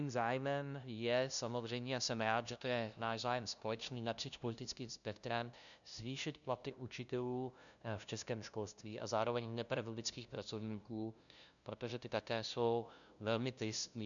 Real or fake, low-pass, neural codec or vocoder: fake; 7.2 kHz; codec, 16 kHz, 0.5 kbps, FunCodec, trained on LibriTTS, 25 frames a second